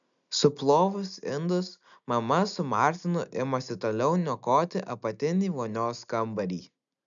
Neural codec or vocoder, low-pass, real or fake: none; 7.2 kHz; real